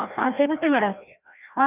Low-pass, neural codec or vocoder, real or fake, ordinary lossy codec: 3.6 kHz; codec, 16 kHz, 1 kbps, FreqCodec, larger model; fake; none